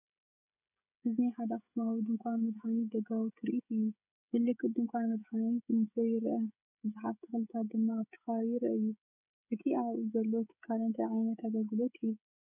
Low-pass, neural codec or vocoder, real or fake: 3.6 kHz; codec, 16 kHz, 16 kbps, FreqCodec, smaller model; fake